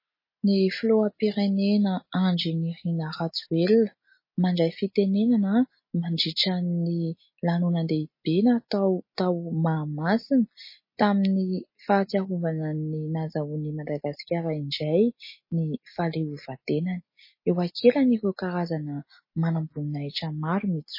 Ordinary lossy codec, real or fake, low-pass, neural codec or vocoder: MP3, 24 kbps; real; 5.4 kHz; none